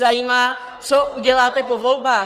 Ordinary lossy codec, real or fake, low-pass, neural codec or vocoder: Opus, 24 kbps; fake; 14.4 kHz; codec, 44.1 kHz, 3.4 kbps, Pupu-Codec